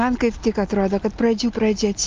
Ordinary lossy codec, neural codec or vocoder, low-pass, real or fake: Opus, 24 kbps; none; 7.2 kHz; real